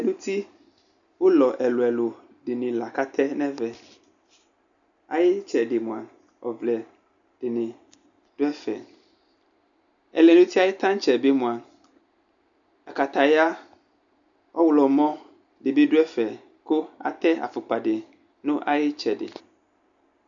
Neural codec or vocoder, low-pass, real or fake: none; 7.2 kHz; real